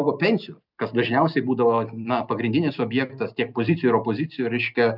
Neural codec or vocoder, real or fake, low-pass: none; real; 5.4 kHz